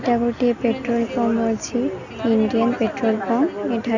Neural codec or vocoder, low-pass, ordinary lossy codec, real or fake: none; 7.2 kHz; none; real